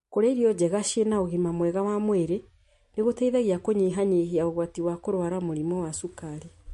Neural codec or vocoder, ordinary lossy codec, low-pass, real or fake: none; MP3, 48 kbps; 14.4 kHz; real